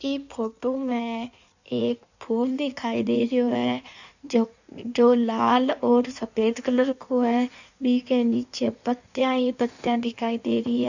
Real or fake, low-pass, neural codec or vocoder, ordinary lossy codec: fake; 7.2 kHz; codec, 16 kHz in and 24 kHz out, 1.1 kbps, FireRedTTS-2 codec; none